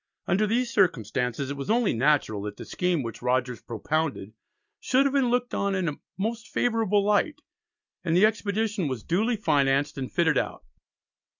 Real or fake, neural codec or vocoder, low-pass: real; none; 7.2 kHz